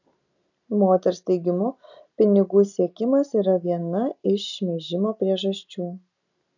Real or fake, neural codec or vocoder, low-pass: real; none; 7.2 kHz